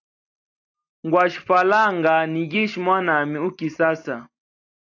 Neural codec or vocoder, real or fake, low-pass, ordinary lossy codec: none; real; 7.2 kHz; AAC, 48 kbps